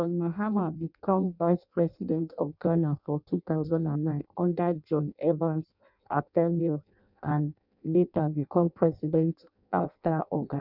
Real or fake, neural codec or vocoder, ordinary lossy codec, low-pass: fake; codec, 16 kHz in and 24 kHz out, 0.6 kbps, FireRedTTS-2 codec; none; 5.4 kHz